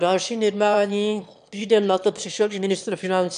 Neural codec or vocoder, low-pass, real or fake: autoencoder, 22.05 kHz, a latent of 192 numbers a frame, VITS, trained on one speaker; 9.9 kHz; fake